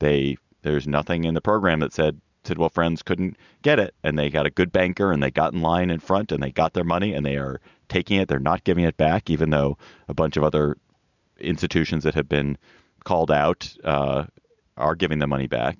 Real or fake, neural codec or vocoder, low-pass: real; none; 7.2 kHz